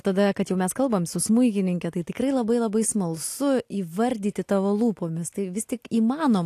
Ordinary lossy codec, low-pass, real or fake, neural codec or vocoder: AAC, 64 kbps; 14.4 kHz; real; none